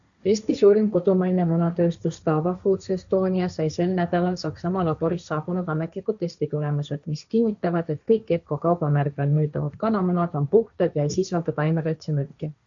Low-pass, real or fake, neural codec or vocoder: 7.2 kHz; fake; codec, 16 kHz, 1.1 kbps, Voila-Tokenizer